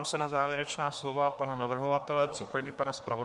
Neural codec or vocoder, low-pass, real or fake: codec, 24 kHz, 1 kbps, SNAC; 10.8 kHz; fake